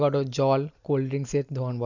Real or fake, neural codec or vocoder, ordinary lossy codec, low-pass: real; none; none; 7.2 kHz